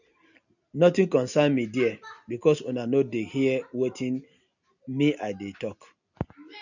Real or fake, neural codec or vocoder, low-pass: real; none; 7.2 kHz